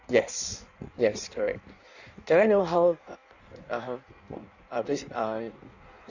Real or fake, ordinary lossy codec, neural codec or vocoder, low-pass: fake; none; codec, 16 kHz in and 24 kHz out, 1.1 kbps, FireRedTTS-2 codec; 7.2 kHz